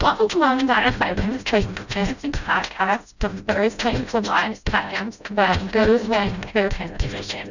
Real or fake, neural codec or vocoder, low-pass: fake; codec, 16 kHz, 0.5 kbps, FreqCodec, smaller model; 7.2 kHz